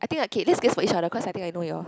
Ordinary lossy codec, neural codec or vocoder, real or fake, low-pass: none; none; real; none